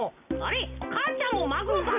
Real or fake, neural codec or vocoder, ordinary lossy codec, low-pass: real; none; none; 3.6 kHz